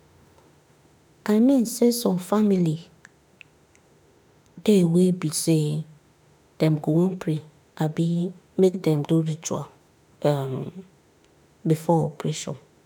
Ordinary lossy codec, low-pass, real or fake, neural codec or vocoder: none; none; fake; autoencoder, 48 kHz, 32 numbers a frame, DAC-VAE, trained on Japanese speech